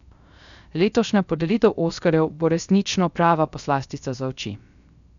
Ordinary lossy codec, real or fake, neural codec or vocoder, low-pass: none; fake; codec, 16 kHz, 0.3 kbps, FocalCodec; 7.2 kHz